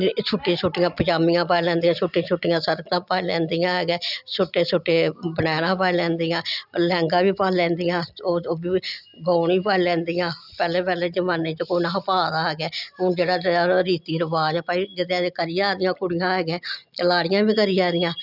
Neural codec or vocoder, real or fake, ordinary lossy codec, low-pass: none; real; none; 5.4 kHz